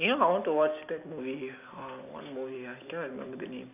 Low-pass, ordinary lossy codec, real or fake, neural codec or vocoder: 3.6 kHz; none; real; none